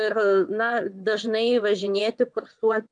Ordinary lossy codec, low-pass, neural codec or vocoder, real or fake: MP3, 64 kbps; 9.9 kHz; vocoder, 22.05 kHz, 80 mel bands, WaveNeXt; fake